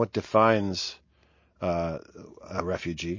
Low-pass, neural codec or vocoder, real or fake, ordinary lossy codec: 7.2 kHz; none; real; MP3, 32 kbps